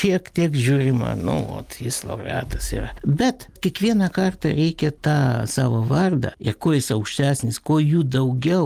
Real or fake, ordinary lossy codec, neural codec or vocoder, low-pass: real; Opus, 32 kbps; none; 14.4 kHz